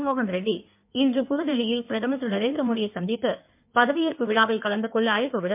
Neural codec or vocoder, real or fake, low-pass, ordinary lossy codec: codec, 16 kHz in and 24 kHz out, 1.1 kbps, FireRedTTS-2 codec; fake; 3.6 kHz; MP3, 32 kbps